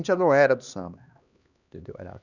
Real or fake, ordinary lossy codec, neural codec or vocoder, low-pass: fake; none; codec, 16 kHz, 2 kbps, X-Codec, HuBERT features, trained on LibriSpeech; 7.2 kHz